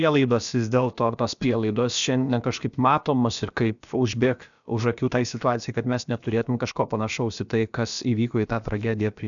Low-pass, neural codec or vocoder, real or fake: 7.2 kHz; codec, 16 kHz, about 1 kbps, DyCAST, with the encoder's durations; fake